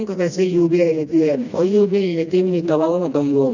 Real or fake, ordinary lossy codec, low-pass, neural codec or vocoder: fake; none; 7.2 kHz; codec, 16 kHz, 1 kbps, FreqCodec, smaller model